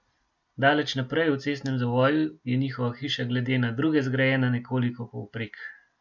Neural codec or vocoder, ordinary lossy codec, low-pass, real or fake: none; none; 7.2 kHz; real